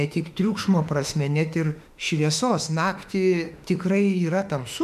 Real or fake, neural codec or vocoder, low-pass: fake; autoencoder, 48 kHz, 32 numbers a frame, DAC-VAE, trained on Japanese speech; 14.4 kHz